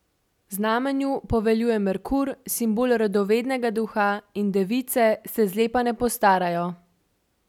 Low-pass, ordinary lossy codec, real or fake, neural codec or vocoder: 19.8 kHz; none; real; none